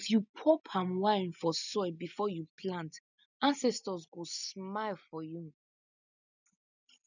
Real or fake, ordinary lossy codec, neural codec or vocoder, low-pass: real; none; none; 7.2 kHz